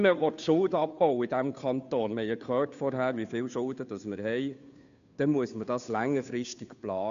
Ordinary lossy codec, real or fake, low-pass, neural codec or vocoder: MP3, 96 kbps; fake; 7.2 kHz; codec, 16 kHz, 2 kbps, FunCodec, trained on Chinese and English, 25 frames a second